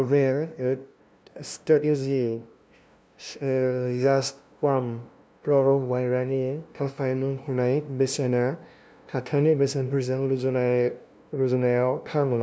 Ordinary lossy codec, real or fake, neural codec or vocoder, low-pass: none; fake; codec, 16 kHz, 0.5 kbps, FunCodec, trained on LibriTTS, 25 frames a second; none